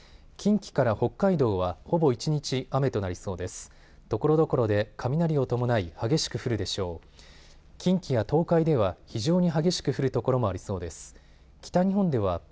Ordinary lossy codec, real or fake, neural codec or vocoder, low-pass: none; real; none; none